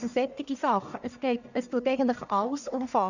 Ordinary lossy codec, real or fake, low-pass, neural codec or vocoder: none; fake; 7.2 kHz; codec, 44.1 kHz, 1.7 kbps, Pupu-Codec